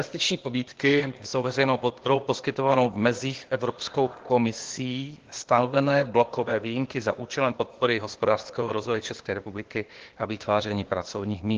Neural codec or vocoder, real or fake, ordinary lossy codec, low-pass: codec, 16 kHz, 0.8 kbps, ZipCodec; fake; Opus, 16 kbps; 7.2 kHz